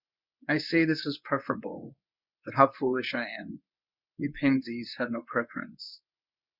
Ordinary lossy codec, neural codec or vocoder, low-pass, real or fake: Opus, 64 kbps; codec, 24 kHz, 0.9 kbps, WavTokenizer, medium speech release version 1; 5.4 kHz; fake